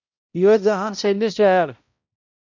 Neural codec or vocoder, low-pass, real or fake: codec, 16 kHz, 0.5 kbps, X-Codec, HuBERT features, trained on balanced general audio; 7.2 kHz; fake